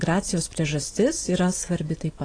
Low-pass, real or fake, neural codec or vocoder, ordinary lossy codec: 9.9 kHz; real; none; AAC, 32 kbps